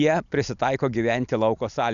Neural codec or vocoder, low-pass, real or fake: none; 7.2 kHz; real